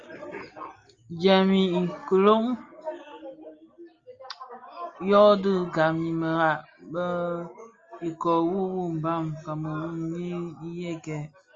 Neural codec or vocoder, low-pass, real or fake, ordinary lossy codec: none; 7.2 kHz; real; Opus, 32 kbps